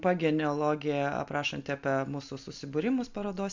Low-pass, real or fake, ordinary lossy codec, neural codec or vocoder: 7.2 kHz; real; MP3, 64 kbps; none